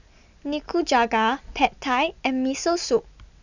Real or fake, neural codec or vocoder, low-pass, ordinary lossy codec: real; none; 7.2 kHz; none